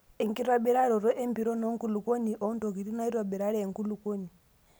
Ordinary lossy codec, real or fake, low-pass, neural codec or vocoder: none; real; none; none